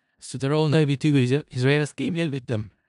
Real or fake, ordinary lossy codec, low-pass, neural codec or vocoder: fake; none; 10.8 kHz; codec, 16 kHz in and 24 kHz out, 0.4 kbps, LongCat-Audio-Codec, four codebook decoder